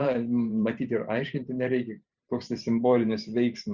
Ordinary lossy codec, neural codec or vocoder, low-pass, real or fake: Opus, 64 kbps; vocoder, 44.1 kHz, 128 mel bands every 512 samples, BigVGAN v2; 7.2 kHz; fake